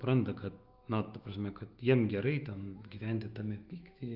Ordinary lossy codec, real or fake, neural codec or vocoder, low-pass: Opus, 24 kbps; fake; autoencoder, 48 kHz, 128 numbers a frame, DAC-VAE, trained on Japanese speech; 5.4 kHz